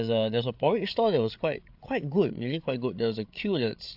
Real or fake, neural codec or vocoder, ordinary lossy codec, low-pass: fake; codec, 16 kHz, 8 kbps, FreqCodec, larger model; AAC, 48 kbps; 5.4 kHz